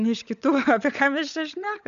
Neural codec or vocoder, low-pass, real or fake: codec, 16 kHz, 16 kbps, FunCodec, trained on LibriTTS, 50 frames a second; 7.2 kHz; fake